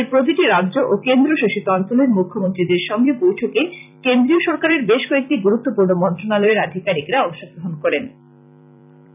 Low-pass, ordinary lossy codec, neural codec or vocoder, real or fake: 3.6 kHz; none; vocoder, 44.1 kHz, 128 mel bands every 256 samples, BigVGAN v2; fake